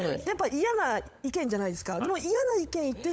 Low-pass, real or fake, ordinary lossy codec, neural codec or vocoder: none; fake; none; codec, 16 kHz, 16 kbps, FunCodec, trained on LibriTTS, 50 frames a second